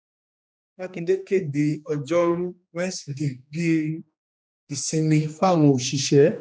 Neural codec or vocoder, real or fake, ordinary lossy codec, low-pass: codec, 16 kHz, 2 kbps, X-Codec, HuBERT features, trained on general audio; fake; none; none